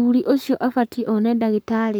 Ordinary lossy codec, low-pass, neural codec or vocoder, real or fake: none; none; codec, 44.1 kHz, 7.8 kbps, Pupu-Codec; fake